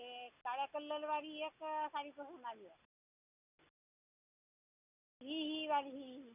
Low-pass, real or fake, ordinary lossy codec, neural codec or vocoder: 3.6 kHz; real; none; none